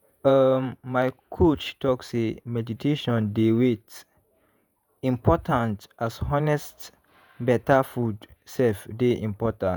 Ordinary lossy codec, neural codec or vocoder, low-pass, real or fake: none; vocoder, 48 kHz, 128 mel bands, Vocos; none; fake